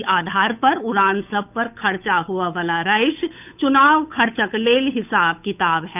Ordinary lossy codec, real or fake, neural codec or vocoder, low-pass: none; fake; codec, 16 kHz, 8 kbps, FunCodec, trained on Chinese and English, 25 frames a second; 3.6 kHz